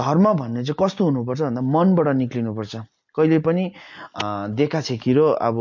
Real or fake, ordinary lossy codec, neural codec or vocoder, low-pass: real; MP3, 48 kbps; none; 7.2 kHz